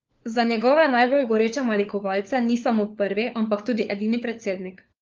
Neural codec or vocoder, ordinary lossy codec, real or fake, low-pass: codec, 16 kHz, 4 kbps, FunCodec, trained on LibriTTS, 50 frames a second; Opus, 32 kbps; fake; 7.2 kHz